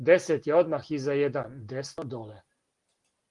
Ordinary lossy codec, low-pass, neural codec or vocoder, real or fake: Opus, 24 kbps; 10.8 kHz; none; real